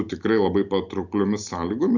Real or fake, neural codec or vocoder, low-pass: real; none; 7.2 kHz